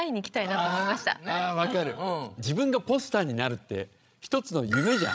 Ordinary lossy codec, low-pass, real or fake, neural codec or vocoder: none; none; fake; codec, 16 kHz, 16 kbps, FreqCodec, larger model